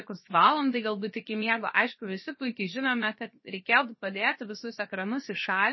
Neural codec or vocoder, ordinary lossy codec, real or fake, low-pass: codec, 16 kHz, 0.7 kbps, FocalCodec; MP3, 24 kbps; fake; 7.2 kHz